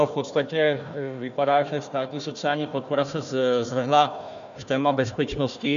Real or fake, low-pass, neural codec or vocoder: fake; 7.2 kHz; codec, 16 kHz, 1 kbps, FunCodec, trained on Chinese and English, 50 frames a second